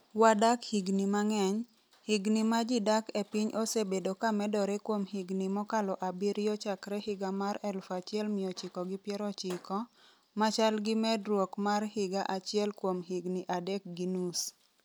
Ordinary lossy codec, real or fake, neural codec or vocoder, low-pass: none; real; none; none